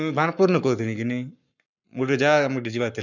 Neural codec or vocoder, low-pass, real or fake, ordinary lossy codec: codec, 44.1 kHz, 7.8 kbps, Pupu-Codec; 7.2 kHz; fake; none